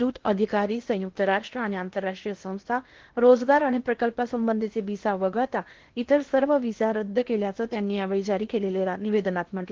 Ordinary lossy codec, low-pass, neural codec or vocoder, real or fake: Opus, 16 kbps; 7.2 kHz; codec, 16 kHz in and 24 kHz out, 0.8 kbps, FocalCodec, streaming, 65536 codes; fake